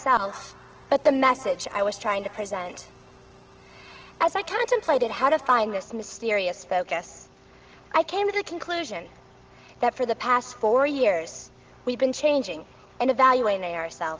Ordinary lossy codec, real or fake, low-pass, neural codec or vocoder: Opus, 16 kbps; real; 7.2 kHz; none